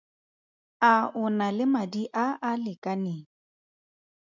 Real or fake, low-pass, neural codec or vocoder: real; 7.2 kHz; none